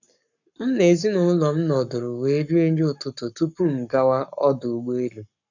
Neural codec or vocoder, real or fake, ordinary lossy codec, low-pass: codec, 44.1 kHz, 7.8 kbps, Pupu-Codec; fake; none; 7.2 kHz